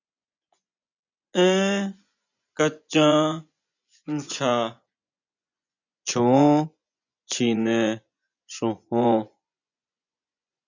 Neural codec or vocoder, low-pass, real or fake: vocoder, 24 kHz, 100 mel bands, Vocos; 7.2 kHz; fake